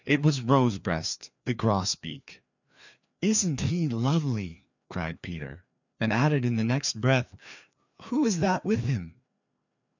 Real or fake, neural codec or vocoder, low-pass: fake; codec, 16 kHz, 2 kbps, FreqCodec, larger model; 7.2 kHz